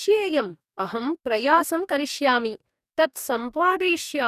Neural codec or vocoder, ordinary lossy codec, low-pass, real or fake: codec, 44.1 kHz, 2.6 kbps, DAC; none; 14.4 kHz; fake